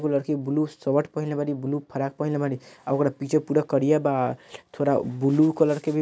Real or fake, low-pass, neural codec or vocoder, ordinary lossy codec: real; none; none; none